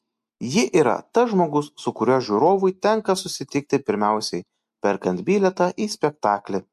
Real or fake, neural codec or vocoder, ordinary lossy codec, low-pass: real; none; MP3, 64 kbps; 14.4 kHz